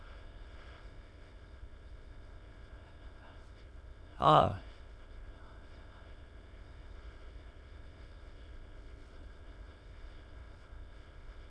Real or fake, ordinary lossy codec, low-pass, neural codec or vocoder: fake; none; none; autoencoder, 22.05 kHz, a latent of 192 numbers a frame, VITS, trained on many speakers